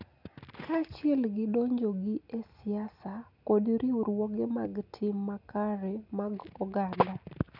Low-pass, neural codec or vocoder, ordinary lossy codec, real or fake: 5.4 kHz; none; none; real